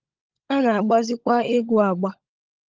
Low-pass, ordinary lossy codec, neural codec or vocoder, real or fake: 7.2 kHz; Opus, 32 kbps; codec, 16 kHz, 16 kbps, FunCodec, trained on LibriTTS, 50 frames a second; fake